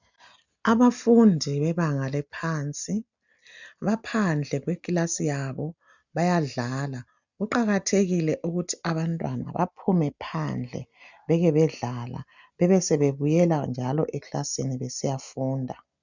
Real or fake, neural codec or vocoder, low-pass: real; none; 7.2 kHz